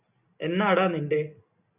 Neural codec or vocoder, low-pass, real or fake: none; 3.6 kHz; real